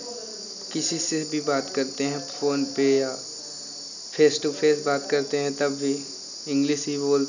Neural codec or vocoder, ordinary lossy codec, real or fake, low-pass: none; none; real; 7.2 kHz